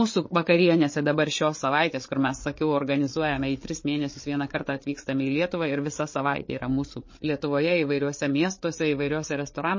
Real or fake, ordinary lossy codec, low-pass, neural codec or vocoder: fake; MP3, 32 kbps; 7.2 kHz; codec, 16 kHz, 8 kbps, FreqCodec, larger model